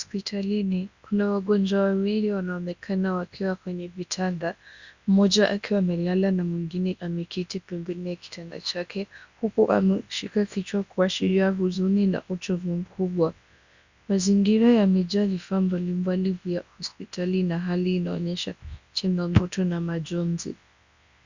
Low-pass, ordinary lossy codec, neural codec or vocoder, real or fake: 7.2 kHz; Opus, 64 kbps; codec, 24 kHz, 0.9 kbps, WavTokenizer, large speech release; fake